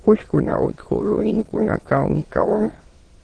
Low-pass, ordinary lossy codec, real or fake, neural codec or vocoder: 9.9 kHz; Opus, 16 kbps; fake; autoencoder, 22.05 kHz, a latent of 192 numbers a frame, VITS, trained on many speakers